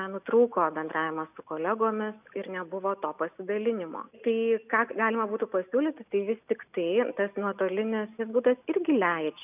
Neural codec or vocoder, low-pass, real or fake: none; 3.6 kHz; real